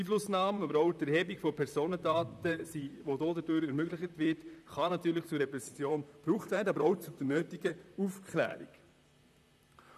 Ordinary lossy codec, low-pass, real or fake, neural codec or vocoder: none; 14.4 kHz; fake; vocoder, 44.1 kHz, 128 mel bands, Pupu-Vocoder